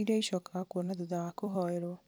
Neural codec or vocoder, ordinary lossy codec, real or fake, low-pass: vocoder, 44.1 kHz, 128 mel bands every 256 samples, BigVGAN v2; none; fake; none